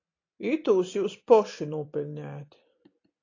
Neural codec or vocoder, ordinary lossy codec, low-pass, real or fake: none; AAC, 32 kbps; 7.2 kHz; real